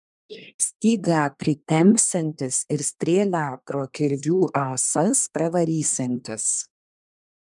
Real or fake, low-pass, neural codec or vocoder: fake; 10.8 kHz; codec, 24 kHz, 1 kbps, SNAC